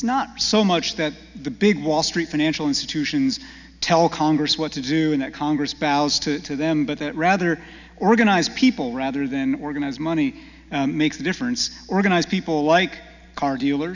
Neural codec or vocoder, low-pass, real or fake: none; 7.2 kHz; real